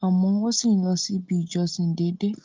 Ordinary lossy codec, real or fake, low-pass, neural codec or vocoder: Opus, 32 kbps; real; 7.2 kHz; none